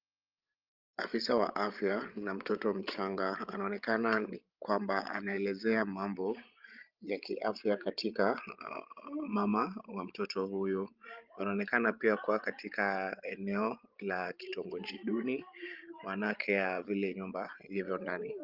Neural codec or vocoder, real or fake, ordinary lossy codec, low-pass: codec, 16 kHz, 16 kbps, FreqCodec, larger model; fake; Opus, 32 kbps; 5.4 kHz